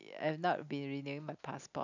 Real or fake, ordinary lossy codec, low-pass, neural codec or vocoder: real; none; 7.2 kHz; none